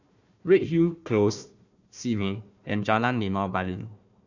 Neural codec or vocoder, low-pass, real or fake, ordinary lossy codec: codec, 16 kHz, 1 kbps, FunCodec, trained on Chinese and English, 50 frames a second; 7.2 kHz; fake; none